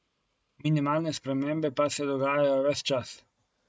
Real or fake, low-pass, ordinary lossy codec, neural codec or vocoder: real; none; none; none